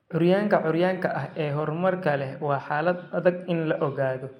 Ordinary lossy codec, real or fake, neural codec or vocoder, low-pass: MP3, 64 kbps; real; none; 19.8 kHz